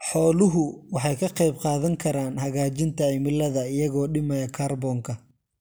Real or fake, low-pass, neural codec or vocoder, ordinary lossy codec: real; none; none; none